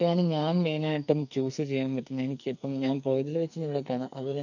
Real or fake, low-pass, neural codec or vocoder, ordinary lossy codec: fake; 7.2 kHz; codec, 44.1 kHz, 2.6 kbps, SNAC; none